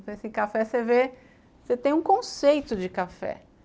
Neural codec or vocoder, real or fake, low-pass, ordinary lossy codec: none; real; none; none